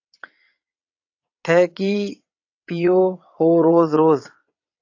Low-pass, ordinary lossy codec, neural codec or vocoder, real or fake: 7.2 kHz; AAC, 48 kbps; vocoder, 22.05 kHz, 80 mel bands, WaveNeXt; fake